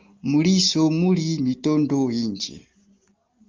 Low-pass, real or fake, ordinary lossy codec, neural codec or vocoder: 7.2 kHz; real; Opus, 24 kbps; none